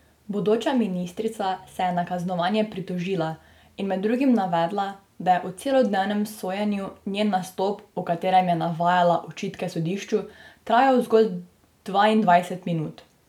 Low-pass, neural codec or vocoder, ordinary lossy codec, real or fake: 19.8 kHz; none; none; real